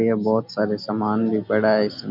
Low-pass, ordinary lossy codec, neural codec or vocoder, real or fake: 5.4 kHz; none; none; real